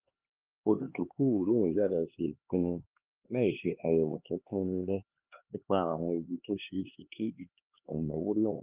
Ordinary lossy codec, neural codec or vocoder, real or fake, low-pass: Opus, 32 kbps; codec, 16 kHz, 2 kbps, X-Codec, HuBERT features, trained on LibriSpeech; fake; 3.6 kHz